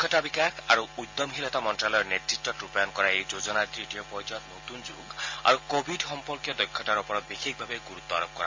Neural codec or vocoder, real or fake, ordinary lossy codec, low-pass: none; real; MP3, 48 kbps; 7.2 kHz